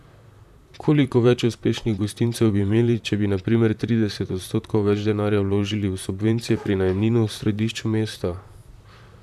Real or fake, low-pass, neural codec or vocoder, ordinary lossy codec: fake; 14.4 kHz; vocoder, 44.1 kHz, 128 mel bands, Pupu-Vocoder; none